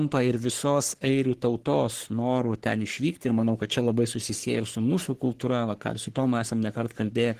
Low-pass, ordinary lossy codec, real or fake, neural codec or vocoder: 14.4 kHz; Opus, 16 kbps; fake; codec, 44.1 kHz, 3.4 kbps, Pupu-Codec